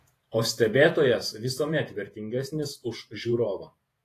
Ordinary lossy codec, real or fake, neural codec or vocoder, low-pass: AAC, 48 kbps; fake; vocoder, 48 kHz, 128 mel bands, Vocos; 14.4 kHz